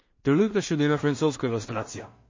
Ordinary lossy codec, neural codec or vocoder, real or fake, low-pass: MP3, 32 kbps; codec, 16 kHz in and 24 kHz out, 0.4 kbps, LongCat-Audio-Codec, two codebook decoder; fake; 7.2 kHz